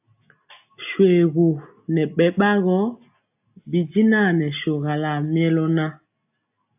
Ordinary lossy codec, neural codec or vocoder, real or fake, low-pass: AAC, 32 kbps; none; real; 3.6 kHz